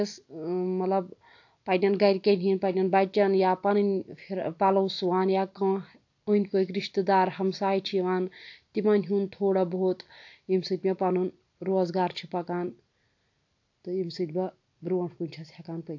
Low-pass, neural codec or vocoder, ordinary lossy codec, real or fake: 7.2 kHz; none; AAC, 48 kbps; real